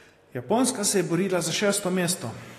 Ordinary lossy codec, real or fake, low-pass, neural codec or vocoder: AAC, 48 kbps; real; 14.4 kHz; none